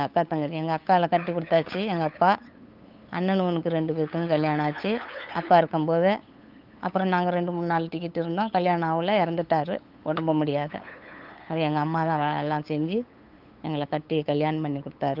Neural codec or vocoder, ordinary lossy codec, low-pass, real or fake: codec, 16 kHz, 8 kbps, FunCodec, trained on LibriTTS, 25 frames a second; Opus, 32 kbps; 5.4 kHz; fake